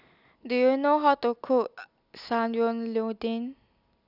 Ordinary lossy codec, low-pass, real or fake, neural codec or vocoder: none; 5.4 kHz; real; none